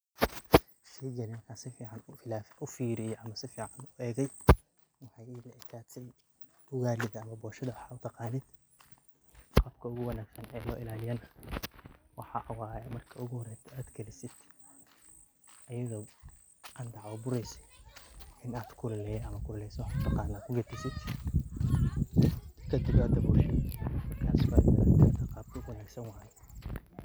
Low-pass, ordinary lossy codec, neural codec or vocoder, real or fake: none; none; none; real